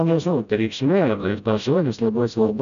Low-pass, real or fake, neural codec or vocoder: 7.2 kHz; fake; codec, 16 kHz, 0.5 kbps, FreqCodec, smaller model